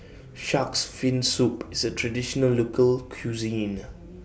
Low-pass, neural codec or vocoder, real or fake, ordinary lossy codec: none; none; real; none